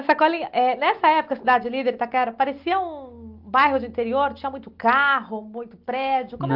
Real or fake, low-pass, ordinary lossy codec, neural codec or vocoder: real; 5.4 kHz; Opus, 32 kbps; none